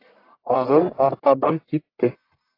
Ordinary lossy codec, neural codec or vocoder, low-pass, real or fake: AAC, 32 kbps; codec, 44.1 kHz, 1.7 kbps, Pupu-Codec; 5.4 kHz; fake